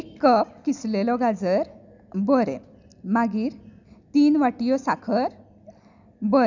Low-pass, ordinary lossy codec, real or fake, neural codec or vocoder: 7.2 kHz; none; real; none